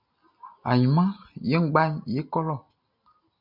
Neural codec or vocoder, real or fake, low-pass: none; real; 5.4 kHz